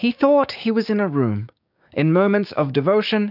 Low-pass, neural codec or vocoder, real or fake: 5.4 kHz; codec, 16 kHz, 6 kbps, DAC; fake